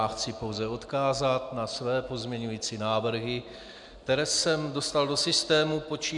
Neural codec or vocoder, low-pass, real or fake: none; 10.8 kHz; real